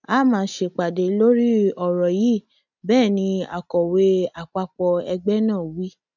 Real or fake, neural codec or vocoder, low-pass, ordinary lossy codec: real; none; 7.2 kHz; none